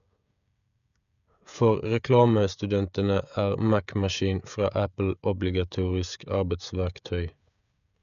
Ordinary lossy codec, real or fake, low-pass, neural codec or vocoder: none; fake; 7.2 kHz; codec, 16 kHz, 16 kbps, FreqCodec, smaller model